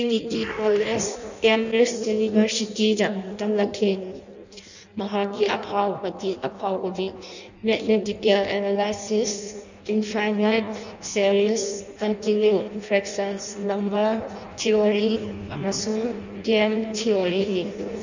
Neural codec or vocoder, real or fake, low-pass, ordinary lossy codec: codec, 16 kHz in and 24 kHz out, 0.6 kbps, FireRedTTS-2 codec; fake; 7.2 kHz; none